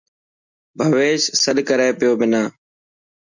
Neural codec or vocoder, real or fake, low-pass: none; real; 7.2 kHz